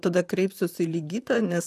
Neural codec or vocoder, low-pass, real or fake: vocoder, 44.1 kHz, 128 mel bands, Pupu-Vocoder; 14.4 kHz; fake